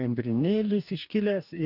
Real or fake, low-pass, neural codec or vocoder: fake; 5.4 kHz; codec, 44.1 kHz, 2.6 kbps, DAC